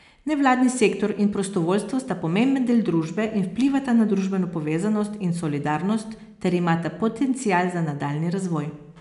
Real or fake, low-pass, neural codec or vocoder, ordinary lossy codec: real; 10.8 kHz; none; none